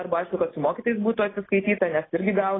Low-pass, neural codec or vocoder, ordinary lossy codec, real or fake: 7.2 kHz; none; AAC, 16 kbps; real